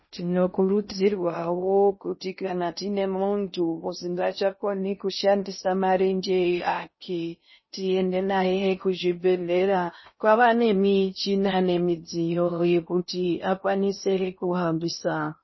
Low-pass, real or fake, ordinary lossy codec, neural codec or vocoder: 7.2 kHz; fake; MP3, 24 kbps; codec, 16 kHz in and 24 kHz out, 0.6 kbps, FocalCodec, streaming, 2048 codes